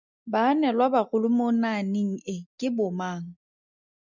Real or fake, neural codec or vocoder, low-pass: real; none; 7.2 kHz